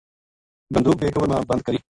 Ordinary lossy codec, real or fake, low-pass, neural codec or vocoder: AAC, 64 kbps; real; 10.8 kHz; none